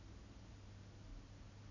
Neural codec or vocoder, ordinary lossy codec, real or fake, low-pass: none; none; real; 7.2 kHz